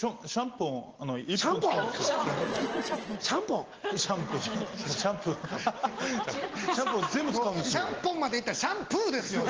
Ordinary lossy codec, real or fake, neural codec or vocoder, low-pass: Opus, 16 kbps; real; none; 7.2 kHz